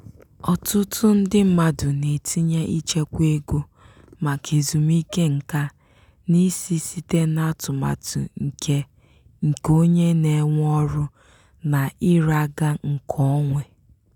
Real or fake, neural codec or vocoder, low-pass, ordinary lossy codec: real; none; 19.8 kHz; none